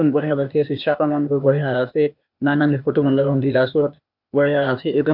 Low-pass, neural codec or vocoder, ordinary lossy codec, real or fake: 5.4 kHz; codec, 16 kHz, 0.8 kbps, ZipCodec; none; fake